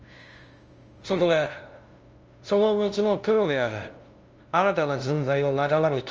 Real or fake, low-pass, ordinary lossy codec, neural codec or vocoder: fake; 7.2 kHz; Opus, 24 kbps; codec, 16 kHz, 0.5 kbps, FunCodec, trained on LibriTTS, 25 frames a second